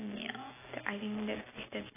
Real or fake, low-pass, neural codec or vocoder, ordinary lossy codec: real; 3.6 kHz; none; AAC, 16 kbps